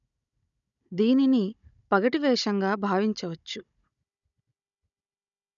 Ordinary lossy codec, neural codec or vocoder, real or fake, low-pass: none; codec, 16 kHz, 16 kbps, FunCodec, trained on Chinese and English, 50 frames a second; fake; 7.2 kHz